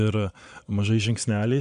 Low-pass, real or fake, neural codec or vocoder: 9.9 kHz; fake; vocoder, 22.05 kHz, 80 mel bands, Vocos